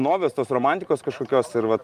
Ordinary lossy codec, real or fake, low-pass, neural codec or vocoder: Opus, 32 kbps; real; 14.4 kHz; none